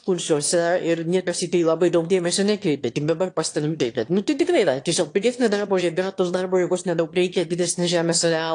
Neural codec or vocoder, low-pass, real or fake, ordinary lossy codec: autoencoder, 22.05 kHz, a latent of 192 numbers a frame, VITS, trained on one speaker; 9.9 kHz; fake; AAC, 48 kbps